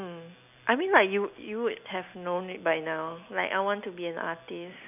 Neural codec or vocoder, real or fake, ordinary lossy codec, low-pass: none; real; none; 3.6 kHz